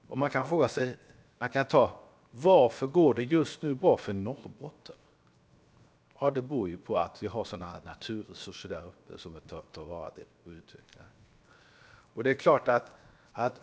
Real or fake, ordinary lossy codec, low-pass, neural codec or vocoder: fake; none; none; codec, 16 kHz, 0.7 kbps, FocalCodec